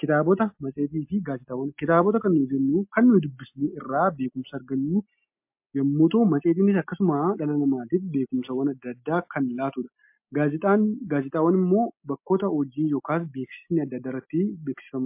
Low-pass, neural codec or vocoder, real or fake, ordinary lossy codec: 3.6 kHz; none; real; MP3, 32 kbps